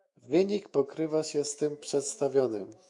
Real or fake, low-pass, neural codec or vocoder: fake; 10.8 kHz; autoencoder, 48 kHz, 128 numbers a frame, DAC-VAE, trained on Japanese speech